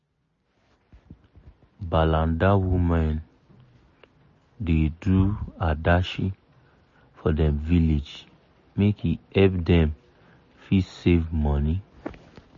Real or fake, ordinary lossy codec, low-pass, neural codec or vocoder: real; MP3, 32 kbps; 7.2 kHz; none